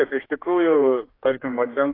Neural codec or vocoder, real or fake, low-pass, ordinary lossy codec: codec, 16 kHz, 2 kbps, X-Codec, HuBERT features, trained on general audio; fake; 5.4 kHz; AAC, 24 kbps